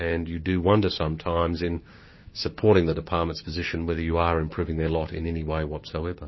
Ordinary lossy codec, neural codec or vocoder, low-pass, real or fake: MP3, 24 kbps; codec, 16 kHz, 6 kbps, DAC; 7.2 kHz; fake